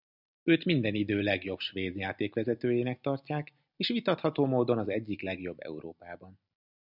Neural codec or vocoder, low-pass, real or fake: none; 5.4 kHz; real